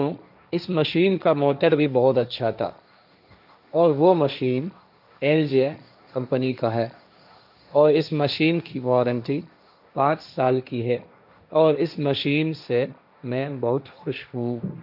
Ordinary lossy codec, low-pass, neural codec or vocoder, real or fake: none; 5.4 kHz; codec, 16 kHz, 1.1 kbps, Voila-Tokenizer; fake